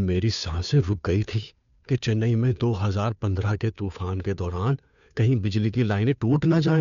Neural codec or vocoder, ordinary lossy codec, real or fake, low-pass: codec, 16 kHz, 2 kbps, FunCodec, trained on Chinese and English, 25 frames a second; none; fake; 7.2 kHz